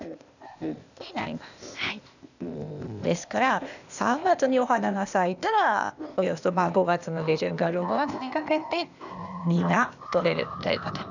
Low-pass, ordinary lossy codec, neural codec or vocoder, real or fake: 7.2 kHz; none; codec, 16 kHz, 0.8 kbps, ZipCodec; fake